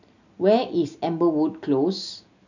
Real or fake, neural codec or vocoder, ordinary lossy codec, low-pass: real; none; none; 7.2 kHz